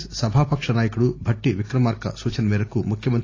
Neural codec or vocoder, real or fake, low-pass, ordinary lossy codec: none; real; 7.2 kHz; AAC, 32 kbps